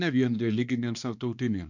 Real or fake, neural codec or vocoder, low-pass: fake; codec, 16 kHz, 2 kbps, X-Codec, HuBERT features, trained on balanced general audio; 7.2 kHz